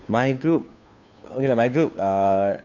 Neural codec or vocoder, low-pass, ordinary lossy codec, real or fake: codec, 16 kHz, 2 kbps, FunCodec, trained on Chinese and English, 25 frames a second; 7.2 kHz; none; fake